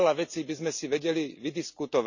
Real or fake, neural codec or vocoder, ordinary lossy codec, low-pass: real; none; none; 7.2 kHz